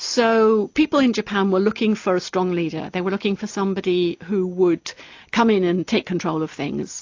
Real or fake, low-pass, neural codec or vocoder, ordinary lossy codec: real; 7.2 kHz; none; AAC, 48 kbps